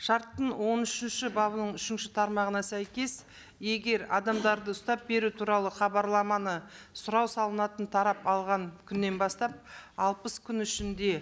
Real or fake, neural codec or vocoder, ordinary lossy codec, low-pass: real; none; none; none